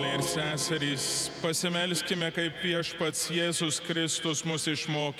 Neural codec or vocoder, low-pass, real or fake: vocoder, 48 kHz, 128 mel bands, Vocos; 19.8 kHz; fake